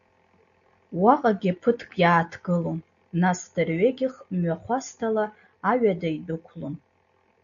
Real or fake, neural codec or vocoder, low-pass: real; none; 7.2 kHz